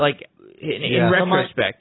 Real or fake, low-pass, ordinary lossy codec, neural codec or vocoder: real; 7.2 kHz; AAC, 16 kbps; none